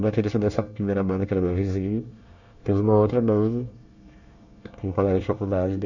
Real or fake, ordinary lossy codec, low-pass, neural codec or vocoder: fake; none; 7.2 kHz; codec, 24 kHz, 1 kbps, SNAC